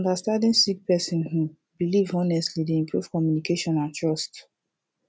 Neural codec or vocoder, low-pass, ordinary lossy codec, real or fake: none; none; none; real